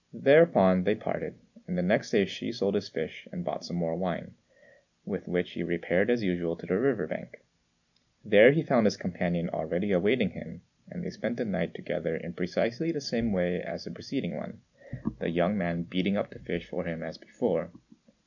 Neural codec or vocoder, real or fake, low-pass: none; real; 7.2 kHz